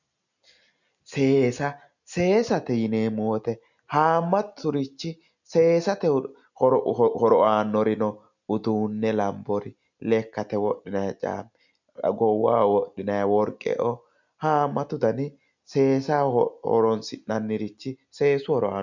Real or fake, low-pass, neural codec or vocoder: real; 7.2 kHz; none